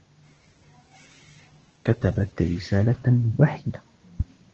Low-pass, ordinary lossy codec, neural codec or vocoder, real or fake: 7.2 kHz; Opus, 16 kbps; codec, 16 kHz, 6 kbps, DAC; fake